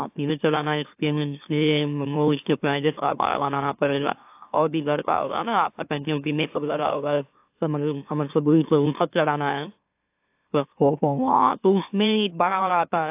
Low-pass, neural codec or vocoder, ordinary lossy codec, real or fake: 3.6 kHz; autoencoder, 44.1 kHz, a latent of 192 numbers a frame, MeloTTS; AAC, 32 kbps; fake